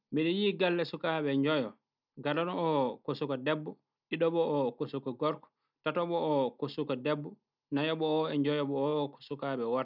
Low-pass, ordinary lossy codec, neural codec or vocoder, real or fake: 5.4 kHz; none; none; real